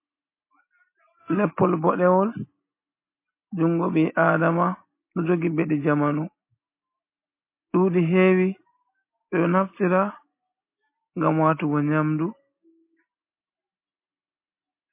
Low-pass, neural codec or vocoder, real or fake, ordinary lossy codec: 3.6 kHz; none; real; MP3, 24 kbps